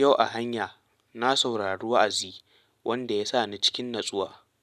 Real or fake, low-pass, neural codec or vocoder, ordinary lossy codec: real; 14.4 kHz; none; none